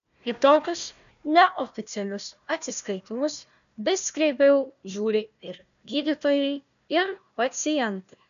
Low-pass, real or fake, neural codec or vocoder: 7.2 kHz; fake; codec, 16 kHz, 1 kbps, FunCodec, trained on Chinese and English, 50 frames a second